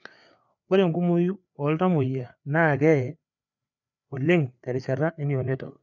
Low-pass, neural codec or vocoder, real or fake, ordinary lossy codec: 7.2 kHz; codec, 16 kHz, 4 kbps, FreqCodec, larger model; fake; none